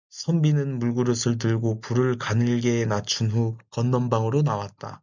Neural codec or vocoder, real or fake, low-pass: none; real; 7.2 kHz